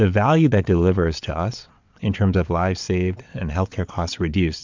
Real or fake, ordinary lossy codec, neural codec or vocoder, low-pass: fake; MP3, 64 kbps; vocoder, 22.05 kHz, 80 mel bands, WaveNeXt; 7.2 kHz